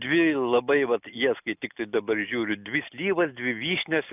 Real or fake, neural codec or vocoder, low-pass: real; none; 3.6 kHz